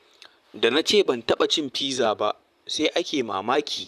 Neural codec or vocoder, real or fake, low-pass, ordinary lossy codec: vocoder, 44.1 kHz, 128 mel bands, Pupu-Vocoder; fake; 14.4 kHz; none